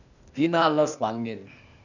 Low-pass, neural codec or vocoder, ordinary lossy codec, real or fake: 7.2 kHz; codec, 16 kHz, 0.8 kbps, ZipCodec; none; fake